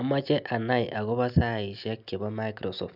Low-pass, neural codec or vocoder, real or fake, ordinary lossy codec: 5.4 kHz; none; real; none